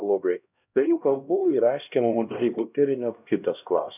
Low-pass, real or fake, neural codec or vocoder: 3.6 kHz; fake; codec, 16 kHz, 1 kbps, X-Codec, HuBERT features, trained on LibriSpeech